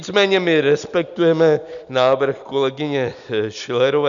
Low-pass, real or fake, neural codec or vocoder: 7.2 kHz; real; none